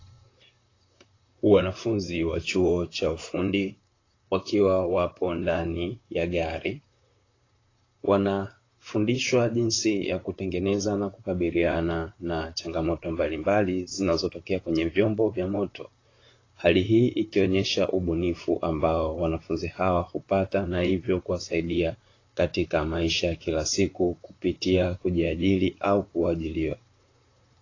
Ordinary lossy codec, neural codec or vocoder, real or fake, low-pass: AAC, 32 kbps; vocoder, 44.1 kHz, 128 mel bands, Pupu-Vocoder; fake; 7.2 kHz